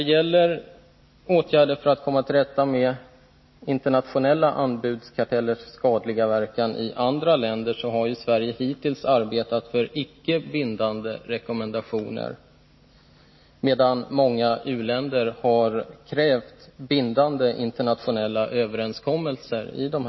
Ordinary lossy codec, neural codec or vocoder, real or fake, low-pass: MP3, 24 kbps; none; real; 7.2 kHz